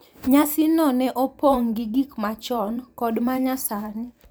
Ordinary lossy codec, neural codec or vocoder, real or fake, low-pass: none; vocoder, 44.1 kHz, 128 mel bands every 512 samples, BigVGAN v2; fake; none